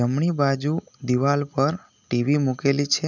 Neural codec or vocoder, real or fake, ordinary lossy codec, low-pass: none; real; none; 7.2 kHz